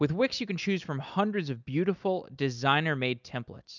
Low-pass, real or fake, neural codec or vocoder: 7.2 kHz; real; none